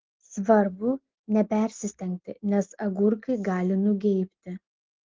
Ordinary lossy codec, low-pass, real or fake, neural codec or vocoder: Opus, 16 kbps; 7.2 kHz; real; none